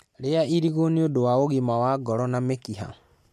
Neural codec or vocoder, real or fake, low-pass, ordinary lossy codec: none; real; 14.4 kHz; MP3, 64 kbps